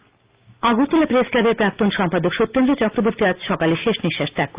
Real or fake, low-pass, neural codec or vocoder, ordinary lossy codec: real; 3.6 kHz; none; Opus, 24 kbps